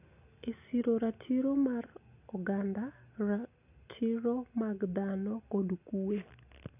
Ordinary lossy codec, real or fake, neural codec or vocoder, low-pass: none; real; none; 3.6 kHz